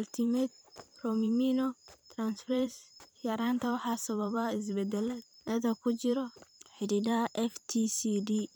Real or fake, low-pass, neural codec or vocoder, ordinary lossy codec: fake; none; vocoder, 44.1 kHz, 128 mel bands every 512 samples, BigVGAN v2; none